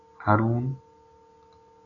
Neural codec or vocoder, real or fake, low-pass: none; real; 7.2 kHz